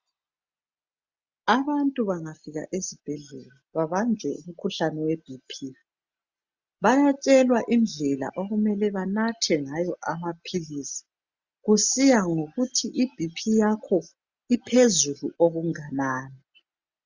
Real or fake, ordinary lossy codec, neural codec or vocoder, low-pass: real; Opus, 64 kbps; none; 7.2 kHz